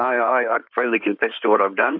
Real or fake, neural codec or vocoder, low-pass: fake; codec, 16 kHz in and 24 kHz out, 2.2 kbps, FireRedTTS-2 codec; 5.4 kHz